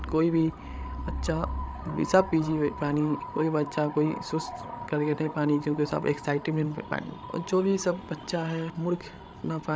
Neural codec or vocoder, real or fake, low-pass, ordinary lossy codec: codec, 16 kHz, 16 kbps, FreqCodec, larger model; fake; none; none